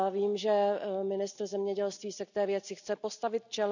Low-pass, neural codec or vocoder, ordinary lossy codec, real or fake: 7.2 kHz; none; none; real